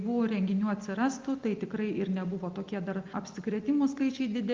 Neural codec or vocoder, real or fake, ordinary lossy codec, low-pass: none; real; Opus, 24 kbps; 7.2 kHz